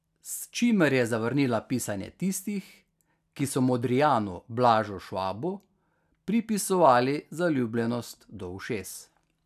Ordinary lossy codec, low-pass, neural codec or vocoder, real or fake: none; 14.4 kHz; none; real